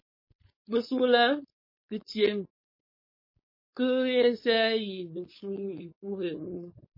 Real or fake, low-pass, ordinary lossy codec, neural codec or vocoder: fake; 5.4 kHz; MP3, 24 kbps; codec, 16 kHz, 4.8 kbps, FACodec